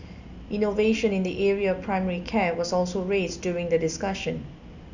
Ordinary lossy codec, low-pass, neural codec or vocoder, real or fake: none; 7.2 kHz; none; real